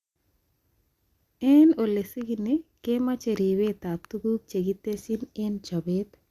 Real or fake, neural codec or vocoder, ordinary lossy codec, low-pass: real; none; Opus, 64 kbps; 14.4 kHz